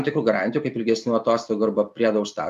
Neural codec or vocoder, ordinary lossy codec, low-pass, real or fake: none; MP3, 96 kbps; 14.4 kHz; real